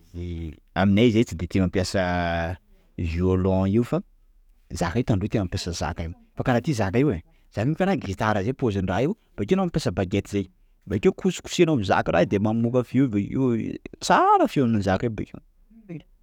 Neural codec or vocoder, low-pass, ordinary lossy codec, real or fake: codec, 44.1 kHz, 7.8 kbps, DAC; 19.8 kHz; none; fake